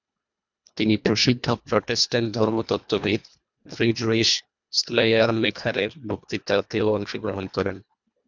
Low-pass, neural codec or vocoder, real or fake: 7.2 kHz; codec, 24 kHz, 1.5 kbps, HILCodec; fake